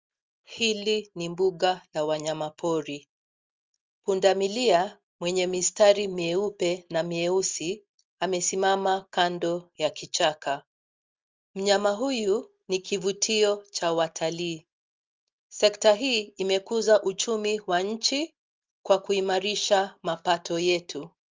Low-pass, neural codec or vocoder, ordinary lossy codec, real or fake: 7.2 kHz; none; Opus, 32 kbps; real